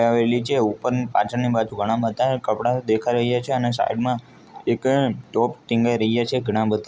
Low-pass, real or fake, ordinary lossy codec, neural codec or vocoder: none; real; none; none